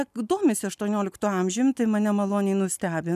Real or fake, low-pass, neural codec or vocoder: real; 14.4 kHz; none